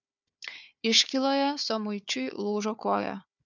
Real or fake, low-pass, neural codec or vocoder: fake; 7.2 kHz; codec, 16 kHz, 4 kbps, FunCodec, trained on Chinese and English, 50 frames a second